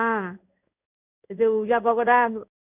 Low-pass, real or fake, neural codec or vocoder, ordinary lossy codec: 3.6 kHz; fake; codec, 16 kHz in and 24 kHz out, 1 kbps, XY-Tokenizer; none